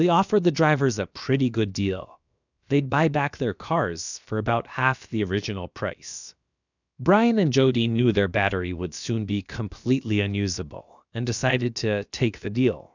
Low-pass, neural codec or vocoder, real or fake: 7.2 kHz; codec, 16 kHz, about 1 kbps, DyCAST, with the encoder's durations; fake